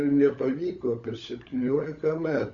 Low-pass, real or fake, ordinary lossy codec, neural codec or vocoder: 7.2 kHz; fake; Opus, 64 kbps; codec, 16 kHz, 16 kbps, FunCodec, trained on LibriTTS, 50 frames a second